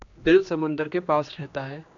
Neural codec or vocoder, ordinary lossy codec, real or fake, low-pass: codec, 16 kHz, 2 kbps, X-Codec, HuBERT features, trained on general audio; AAC, 64 kbps; fake; 7.2 kHz